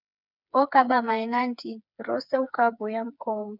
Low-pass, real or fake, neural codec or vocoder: 5.4 kHz; fake; codec, 16 kHz, 4 kbps, FreqCodec, smaller model